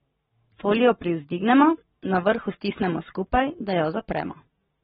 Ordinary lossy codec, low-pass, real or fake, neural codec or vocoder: AAC, 16 kbps; 19.8 kHz; fake; codec, 44.1 kHz, 7.8 kbps, Pupu-Codec